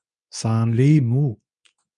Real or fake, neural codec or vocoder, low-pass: fake; codec, 24 kHz, 0.9 kbps, WavTokenizer, medium speech release version 2; 10.8 kHz